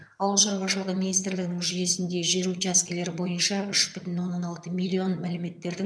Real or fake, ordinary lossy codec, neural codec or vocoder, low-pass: fake; none; vocoder, 22.05 kHz, 80 mel bands, HiFi-GAN; none